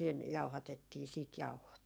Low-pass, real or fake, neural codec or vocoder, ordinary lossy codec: none; fake; codec, 44.1 kHz, 7.8 kbps, DAC; none